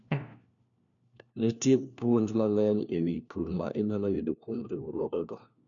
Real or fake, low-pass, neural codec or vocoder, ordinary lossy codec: fake; 7.2 kHz; codec, 16 kHz, 1 kbps, FunCodec, trained on LibriTTS, 50 frames a second; none